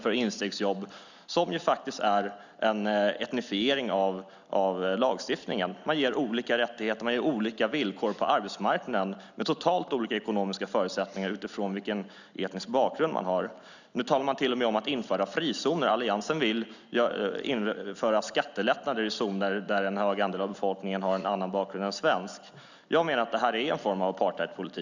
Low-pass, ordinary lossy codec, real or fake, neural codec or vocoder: 7.2 kHz; none; real; none